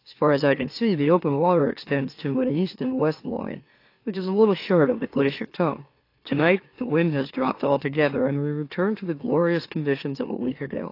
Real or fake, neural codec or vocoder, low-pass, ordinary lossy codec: fake; autoencoder, 44.1 kHz, a latent of 192 numbers a frame, MeloTTS; 5.4 kHz; AAC, 32 kbps